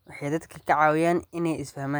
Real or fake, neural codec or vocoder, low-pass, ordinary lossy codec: real; none; none; none